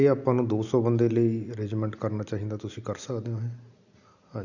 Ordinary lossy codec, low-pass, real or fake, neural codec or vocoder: none; 7.2 kHz; real; none